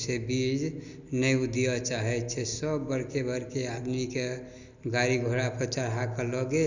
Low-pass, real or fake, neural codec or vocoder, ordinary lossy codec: 7.2 kHz; real; none; none